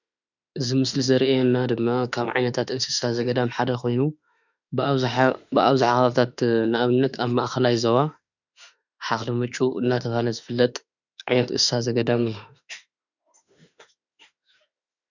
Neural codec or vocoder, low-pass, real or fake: autoencoder, 48 kHz, 32 numbers a frame, DAC-VAE, trained on Japanese speech; 7.2 kHz; fake